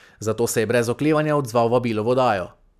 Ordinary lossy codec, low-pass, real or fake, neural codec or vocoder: none; 14.4 kHz; real; none